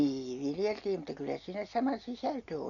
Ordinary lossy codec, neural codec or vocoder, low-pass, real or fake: none; none; 7.2 kHz; real